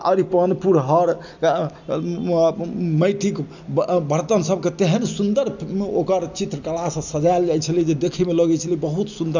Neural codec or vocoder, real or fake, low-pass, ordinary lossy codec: none; real; 7.2 kHz; none